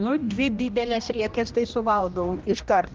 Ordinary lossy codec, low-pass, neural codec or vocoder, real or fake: Opus, 32 kbps; 7.2 kHz; codec, 16 kHz, 1 kbps, X-Codec, HuBERT features, trained on general audio; fake